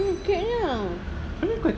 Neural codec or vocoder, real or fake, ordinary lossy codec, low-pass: none; real; none; none